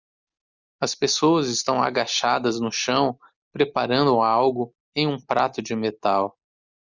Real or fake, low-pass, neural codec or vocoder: real; 7.2 kHz; none